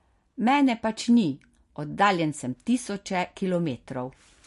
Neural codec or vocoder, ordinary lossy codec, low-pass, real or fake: none; MP3, 48 kbps; 14.4 kHz; real